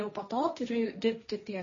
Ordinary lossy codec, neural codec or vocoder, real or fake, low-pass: MP3, 32 kbps; codec, 16 kHz, 1.1 kbps, Voila-Tokenizer; fake; 7.2 kHz